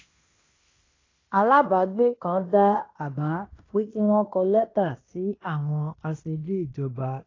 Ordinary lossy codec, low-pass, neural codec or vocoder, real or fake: AAC, 32 kbps; 7.2 kHz; codec, 16 kHz in and 24 kHz out, 0.9 kbps, LongCat-Audio-Codec, fine tuned four codebook decoder; fake